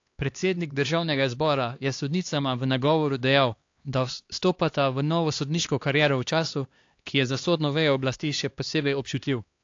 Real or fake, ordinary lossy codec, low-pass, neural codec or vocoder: fake; AAC, 48 kbps; 7.2 kHz; codec, 16 kHz, 2 kbps, X-Codec, HuBERT features, trained on LibriSpeech